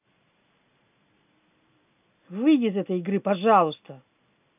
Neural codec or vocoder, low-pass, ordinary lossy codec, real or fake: none; 3.6 kHz; none; real